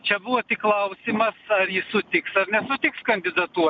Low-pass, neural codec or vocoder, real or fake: 7.2 kHz; none; real